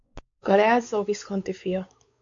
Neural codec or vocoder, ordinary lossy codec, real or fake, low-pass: codec, 16 kHz, 4 kbps, X-Codec, WavLM features, trained on Multilingual LibriSpeech; MP3, 64 kbps; fake; 7.2 kHz